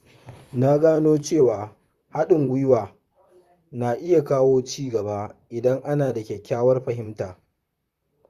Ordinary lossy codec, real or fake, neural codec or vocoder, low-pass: Opus, 64 kbps; fake; vocoder, 44.1 kHz, 128 mel bands, Pupu-Vocoder; 14.4 kHz